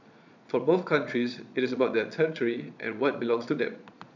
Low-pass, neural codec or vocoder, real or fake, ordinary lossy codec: 7.2 kHz; vocoder, 22.05 kHz, 80 mel bands, Vocos; fake; none